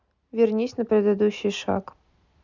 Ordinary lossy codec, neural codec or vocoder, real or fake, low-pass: none; none; real; 7.2 kHz